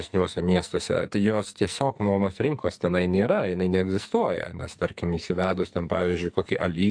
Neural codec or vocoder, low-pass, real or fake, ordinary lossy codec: codec, 44.1 kHz, 2.6 kbps, SNAC; 9.9 kHz; fake; AAC, 64 kbps